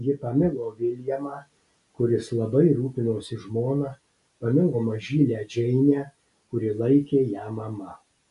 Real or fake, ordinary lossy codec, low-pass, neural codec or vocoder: real; AAC, 96 kbps; 10.8 kHz; none